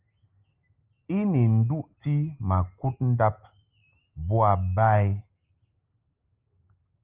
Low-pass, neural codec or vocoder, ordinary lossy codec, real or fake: 3.6 kHz; none; Opus, 32 kbps; real